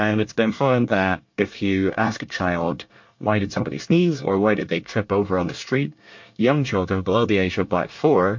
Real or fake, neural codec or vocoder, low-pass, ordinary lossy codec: fake; codec, 24 kHz, 1 kbps, SNAC; 7.2 kHz; MP3, 48 kbps